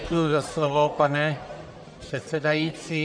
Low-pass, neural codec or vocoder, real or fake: 9.9 kHz; codec, 44.1 kHz, 1.7 kbps, Pupu-Codec; fake